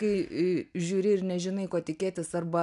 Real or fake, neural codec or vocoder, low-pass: real; none; 10.8 kHz